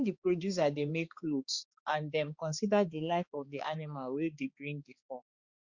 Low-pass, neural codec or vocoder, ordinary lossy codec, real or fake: 7.2 kHz; codec, 16 kHz, 2 kbps, X-Codec, HuBERT features, trained on balanced general audio; Opus, 64 kbps; fake